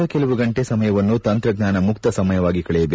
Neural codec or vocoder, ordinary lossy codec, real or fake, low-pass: none; none; real; none